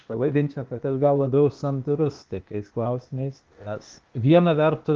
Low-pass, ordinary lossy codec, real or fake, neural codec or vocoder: 7.2 kHz; Opus, 32 kbps; fake; codec, 16 kHz, 0.8 kbps, ZipCodec